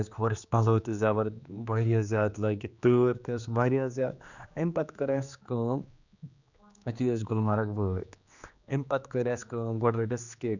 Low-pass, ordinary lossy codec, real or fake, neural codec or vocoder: 7.2 kHz; none; fake; codec, 16 kHz, 2 kbps, X-Codec, HuBERT features, trained on general audio